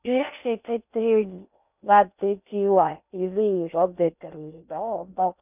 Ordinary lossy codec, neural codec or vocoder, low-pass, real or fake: none; codec, 16 kHz in and 24 kHz out, 0.6 kbps, FocalCodec, streaming, 4096 codes; 3.6 kHz; fake